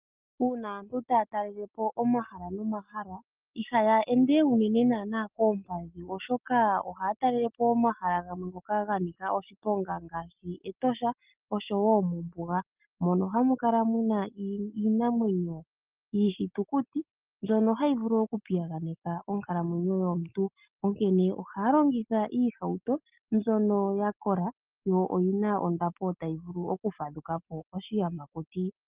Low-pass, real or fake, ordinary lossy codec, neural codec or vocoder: 3.6 kHz; real; Opus, 32 kbps; none